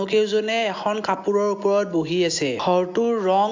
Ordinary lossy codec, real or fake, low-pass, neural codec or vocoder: AAC, 48 kbps; real; 7.2 kHz; none